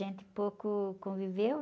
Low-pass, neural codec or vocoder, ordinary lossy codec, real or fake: none; none; none; real